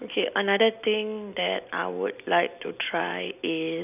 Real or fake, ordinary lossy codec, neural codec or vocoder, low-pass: real; none; none; 3.6 kHz